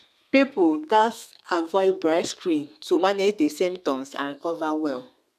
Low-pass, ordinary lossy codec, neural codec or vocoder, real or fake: 14.4 kHz; none; codec, 32 kHz, 1.9 kbps, SNAC; fake